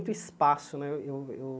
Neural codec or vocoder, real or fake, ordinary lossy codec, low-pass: none; real; none; none